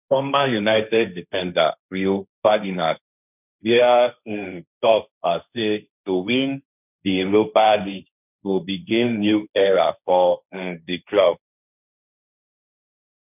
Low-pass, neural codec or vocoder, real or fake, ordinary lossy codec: 3.6 kHz; codec, 16 kHz, 1.1 kbps, Voila-Tokenizer; fake; none